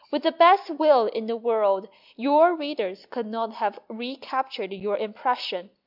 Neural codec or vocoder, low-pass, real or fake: none; 5.4 kHz; real